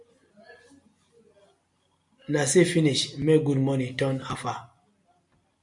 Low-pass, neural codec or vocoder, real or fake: 10.8 kHz; none; real